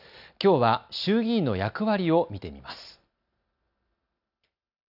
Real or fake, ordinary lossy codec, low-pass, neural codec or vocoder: real; none; 5.4 kHz; none